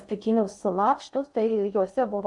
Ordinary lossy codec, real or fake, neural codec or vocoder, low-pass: AAC, 64 kbps; fake; codec, 16 kHz in and 24 kHz out, 0.6 kbps, FocalCodec, streaming, 4096 codes; 10.8 kHz